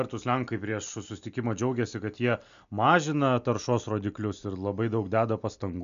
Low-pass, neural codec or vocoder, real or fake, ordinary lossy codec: 7.2 kHz; none; real; MP3, 96 kbps